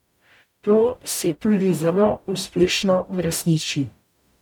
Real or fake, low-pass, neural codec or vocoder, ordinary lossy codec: fake; 19.8 kHz; codec, 44.1 kHz, 0.9 kbps, DAC; none